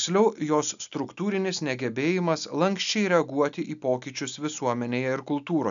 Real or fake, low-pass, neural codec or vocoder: real; 7.2 kHz; none